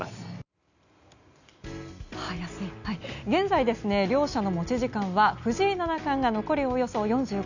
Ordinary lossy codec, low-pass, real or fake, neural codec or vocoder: none; 7.2 kHz; real; none